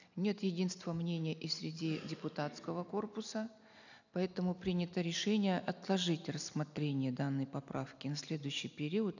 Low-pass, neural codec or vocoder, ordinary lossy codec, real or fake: 7.2 kHz; none; none; real